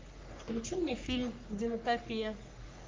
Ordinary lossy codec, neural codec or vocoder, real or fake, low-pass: Opus, 24 kbps; codec, 44.1 kHz, 3.4 kbps, Pupu-Codec; fake; 7.2 kHz